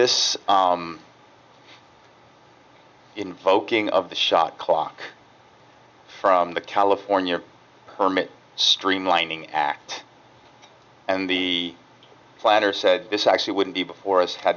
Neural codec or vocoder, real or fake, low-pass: none; real; 7.2 kHz